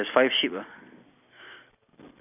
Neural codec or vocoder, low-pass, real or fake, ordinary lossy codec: none; 3.6 kHz; real; none